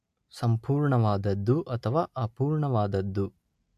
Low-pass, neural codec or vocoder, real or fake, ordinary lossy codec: 14.4 kHz; none; real; AAC, 96 kbps